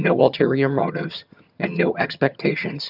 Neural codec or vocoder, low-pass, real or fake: vocoder, 22.05 kHz, 80 mel bands, HiFi-GAN; 5.4 kHz; fake